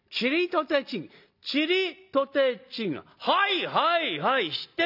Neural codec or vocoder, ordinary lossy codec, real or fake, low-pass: none; none; real; 5.4 kHz